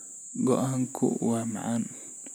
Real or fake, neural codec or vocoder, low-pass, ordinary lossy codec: real; none; none; none